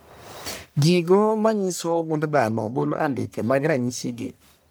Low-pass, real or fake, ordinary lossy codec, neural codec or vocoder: none; fake; none; codec, 44.1 kHz, 1.7 kbps, Pupu-Codec